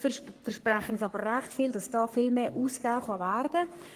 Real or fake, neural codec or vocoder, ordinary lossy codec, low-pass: fake; codec, 44.1 kHz, 3.4 kbps, Pupu-Codec; Opus, 24 kbps; 14.4 kHz